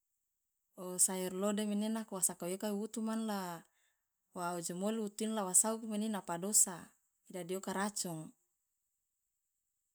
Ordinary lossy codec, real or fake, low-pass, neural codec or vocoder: none; real; none; none